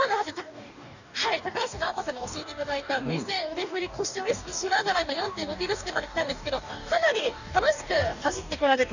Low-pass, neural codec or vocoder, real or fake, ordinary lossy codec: 7.2 kHz; codec, 44.1 kHz, 2.6 kbps, DAC; fake; none